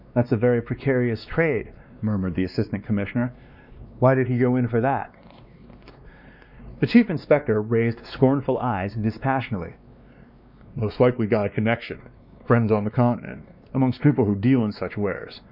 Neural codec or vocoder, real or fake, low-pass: codec, 16 kHz, 2 kbps, X-Codec, WavLM features, trained on Multilingual LibriSpeech; fake; 5.4 kHz